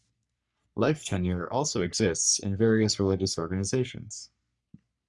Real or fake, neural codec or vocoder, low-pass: fake; codec, 44.1 kHz, 3.4 kbps, Pupu-Codec; 10.8 kHz